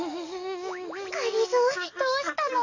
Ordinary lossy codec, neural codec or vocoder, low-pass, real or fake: AAC, 48 kbps; codec, 44.1 kHz, 7.8 kbps, DAC; 7.2 kHz; fake